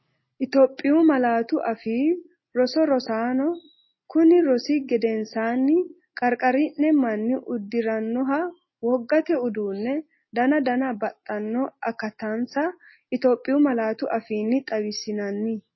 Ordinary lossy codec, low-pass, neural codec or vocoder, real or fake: MP3, 24 kbps; 7.2 kHz; none; real